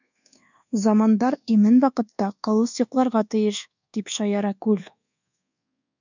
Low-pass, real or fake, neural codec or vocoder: 7.2 kHz; fake; codec, 24 kHz, 1.2 kbps, DualCodec